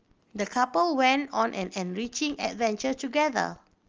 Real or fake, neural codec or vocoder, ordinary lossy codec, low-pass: real; none; Opus, 24 kbps; 7.2 kHz